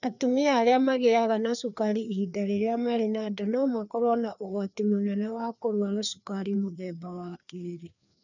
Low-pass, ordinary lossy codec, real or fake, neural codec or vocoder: 7.2 kHz; none; fake; codec, 16 kHz, 2 kbps, FreqCodec, larger model